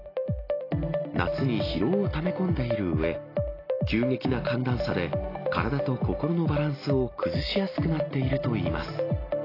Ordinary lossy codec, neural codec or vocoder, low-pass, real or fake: AAC, 24 kbps; none; 5.4 kHz; real